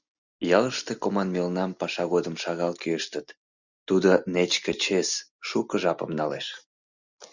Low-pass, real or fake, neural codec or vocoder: 7.2 kHz; real; none